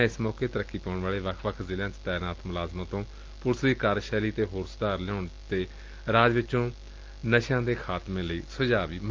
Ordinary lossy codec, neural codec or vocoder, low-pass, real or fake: Opus, 32 kbps; none; 7.2 kHz; real